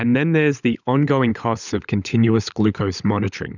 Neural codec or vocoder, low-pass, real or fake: codec, 16 kHz, 16 kbps, FunCodec, trained on LibriTTS, 50 frames a second; 7.2 kHz; fake